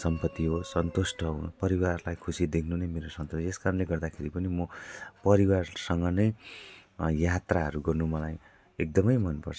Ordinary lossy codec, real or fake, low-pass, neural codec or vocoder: none; real; none; none